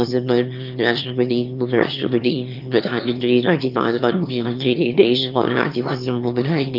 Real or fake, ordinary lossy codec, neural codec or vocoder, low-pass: fake; Opus, 24 kbps; autoencoder, 22.05 kHz, a latent of 192 numbers a frame, VITS, trained on one speaker; 5.4 kHz